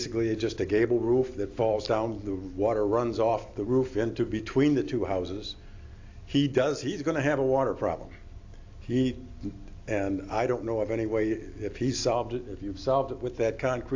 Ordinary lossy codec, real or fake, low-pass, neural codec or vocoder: AAC, 48 kbps; real; 7.2 kHz; none